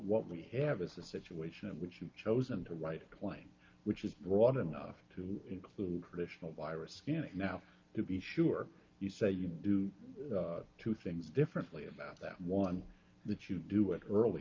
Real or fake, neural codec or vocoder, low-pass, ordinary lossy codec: real; none; 7.2 kHz; Opus, 24 kbps